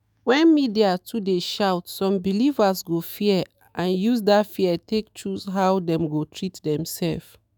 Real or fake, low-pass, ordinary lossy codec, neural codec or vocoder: fake; none; none; autoencoder, 48 kHz, 128 numbers a frame, DAC-VAE, trained on Japanese speech